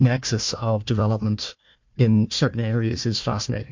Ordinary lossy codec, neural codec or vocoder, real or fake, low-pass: MP3, 48 kbps; codec, 16 kHz, 1 kbps, FunCodec, trained on Chinese and English, 50 frames a second; fake; 7.2 kHz